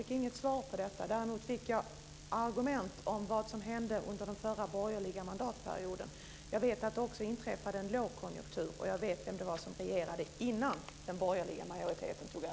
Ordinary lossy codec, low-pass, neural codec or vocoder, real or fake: none; none; none; real